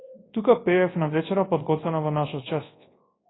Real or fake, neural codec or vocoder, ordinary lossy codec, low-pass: fake; codec, 24 kHz, 0.9 kbps, WavTokenizer, large speech release; AAC, 16 kbps; 7.2 kHz